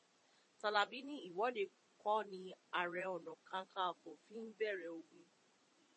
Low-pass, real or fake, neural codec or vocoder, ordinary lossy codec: 9.9 kHz; fake; vocoder, 22.05 kHz, 80 mel bands, Vocos; MP3, 32 kbps